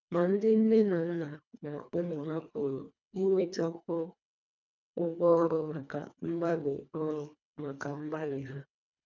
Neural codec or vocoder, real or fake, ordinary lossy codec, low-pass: codec, 24 kHz, 1.5 kbps, HILCodec; fake; none; 7.2 kHz